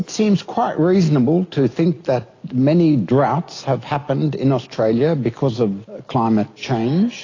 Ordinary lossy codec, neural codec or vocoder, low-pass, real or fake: AAC, 32 kbps; none; 7.2 kHz; real